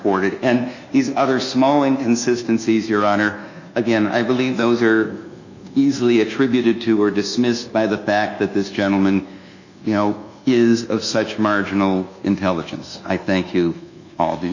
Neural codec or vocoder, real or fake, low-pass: codec, 24 kHz, 1.2 kbps, DualCodec; fake; 7.2 kHz